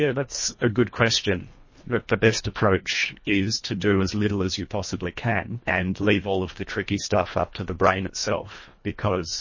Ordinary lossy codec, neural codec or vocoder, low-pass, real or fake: MP3, 32 kbps; codec, 24 kHz, 1.5 kbps, HILCodec; 7.2 kHz; fake